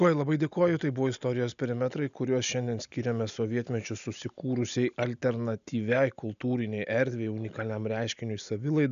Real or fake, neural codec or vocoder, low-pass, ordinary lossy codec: real; none; 7.2 kHz; MP3, 96 kbps